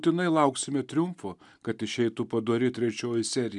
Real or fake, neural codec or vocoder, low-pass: real; none; 10.8 kHz